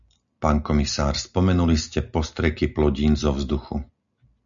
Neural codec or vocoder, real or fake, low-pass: none; real; 7.2 kHz